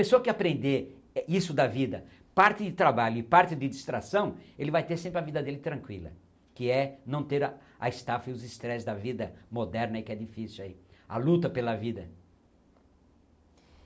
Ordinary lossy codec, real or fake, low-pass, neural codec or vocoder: none; real; none; none